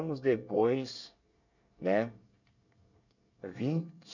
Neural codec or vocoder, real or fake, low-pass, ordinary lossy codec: codec, 24 kHz, 1 kbps, SNAC; fake; 7.2 kHz; none